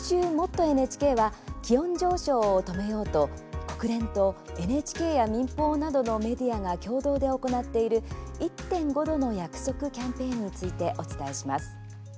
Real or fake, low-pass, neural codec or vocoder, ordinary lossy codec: real; none; none; none